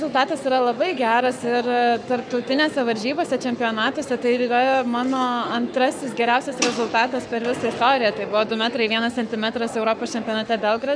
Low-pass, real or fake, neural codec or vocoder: 9.9 kHz; fake; codec, 44.1 kHz, 7.8 kbps, Pupu-Codec